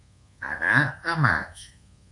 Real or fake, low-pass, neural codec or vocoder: fake; 10.8 kHz; codec, 24 kHz, 1.2 kbps, DualCodec